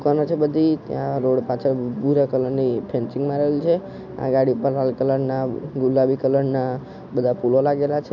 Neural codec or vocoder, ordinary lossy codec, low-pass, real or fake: none; none; 7.2 kHz; real